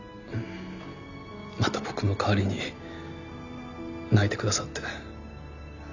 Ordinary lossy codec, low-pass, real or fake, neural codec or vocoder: none; 7.2 kHz; real; none